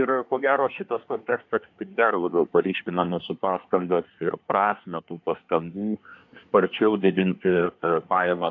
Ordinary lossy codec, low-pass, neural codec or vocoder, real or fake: AAC, 48 kbps; 7.2 kHz; codec, 24 kHz, 1 kbps, SNAC; fake